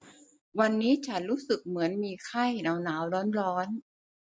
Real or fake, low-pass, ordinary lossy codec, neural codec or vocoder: real; none; none; none